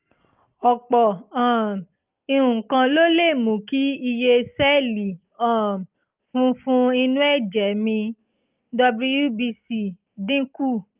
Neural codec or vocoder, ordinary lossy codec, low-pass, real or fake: none; Opus, 32 kbps; 3.6 kHz; real